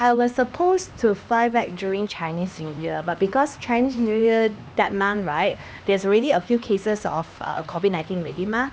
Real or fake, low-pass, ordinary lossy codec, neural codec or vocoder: fake; none; none; codec, 16 kHz, 2 kbps, X-Codec, HuBERT features, trained on LibriSpeech